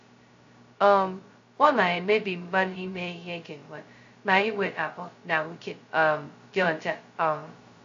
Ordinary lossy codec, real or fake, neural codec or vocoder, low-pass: AAC, 48 kbps; fake; codec, 16 kHz, 0.2 kbps, FocalCodec; 7.2 kHz